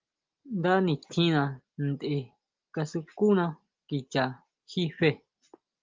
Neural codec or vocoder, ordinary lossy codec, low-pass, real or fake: none; Opus, 32 kbps; 7.2 kHz; real